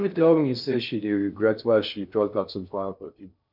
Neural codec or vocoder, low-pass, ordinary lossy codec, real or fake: codec, 16 kHz in and 24 kHz out, 0.6 kbps, FocalCodec, streaming, 2048 codes; 5.4 kHz; none; fake